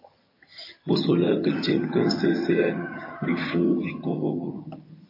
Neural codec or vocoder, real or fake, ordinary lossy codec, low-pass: vocoder, 22.05 kHz, 80 mel bands, HiFi-GAN; fake; MP3, 24 kbps; 5.4 kHz